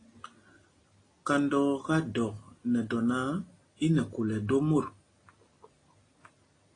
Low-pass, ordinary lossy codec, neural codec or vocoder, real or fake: 9.9 kHz; AAC, 32 kbps; none; real